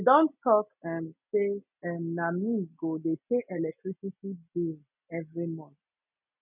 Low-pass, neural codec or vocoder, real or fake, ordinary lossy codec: 3.6 kHz; none; real; none